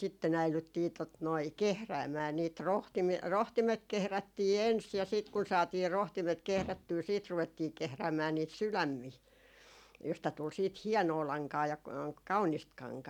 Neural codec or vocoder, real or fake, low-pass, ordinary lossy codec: none; real; 19.8 kHz; none